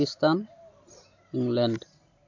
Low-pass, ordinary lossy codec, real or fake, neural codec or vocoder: 7.2 kHz; MP3, 64 kbps; real; none